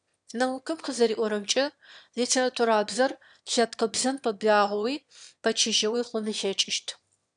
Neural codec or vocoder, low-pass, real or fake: autoencoder, 22.05 kHz, a latent of 192 numbers a frame, VITS, trained on one speaker; 9.9 kHz; fake